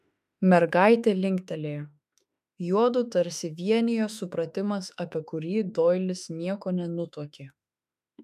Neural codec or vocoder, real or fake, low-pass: autoencoder, 48 kHz, 32 numbers a frame, DAC-VAE, trained on Japanese speech; fake; 14.4 kHz